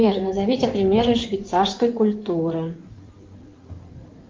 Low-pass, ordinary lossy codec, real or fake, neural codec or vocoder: 7.2 kHz; Opus, 32 kbps; fake; codec, 16 kHz in and 24 kHz out, 2.2 kbps, FireRedTTS-2 codec